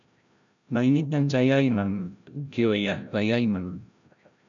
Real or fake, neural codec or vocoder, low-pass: fake; codec, 16 kHz, 0.5 kbps, FreqCodec, larger model; 7.2 kHz